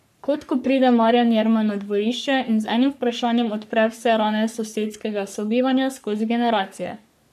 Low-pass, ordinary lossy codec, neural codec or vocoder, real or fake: 14.4 kHz; none; codec, 44.1 kHz, 3.4 kbps, Pupu-Codec; fake